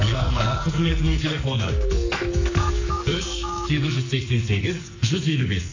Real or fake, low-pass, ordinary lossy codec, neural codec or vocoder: fake; 7.2 kHz; AAC, 48 kbps; codec, 44.1 kHz, 2.6 kbps, SNAC